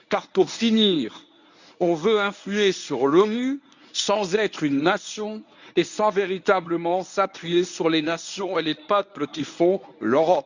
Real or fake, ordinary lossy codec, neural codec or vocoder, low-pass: fake; none; codec, 24 kHz, 0.9 kbps, WavTokenizer, medium speech release version 1; 7.2 kHz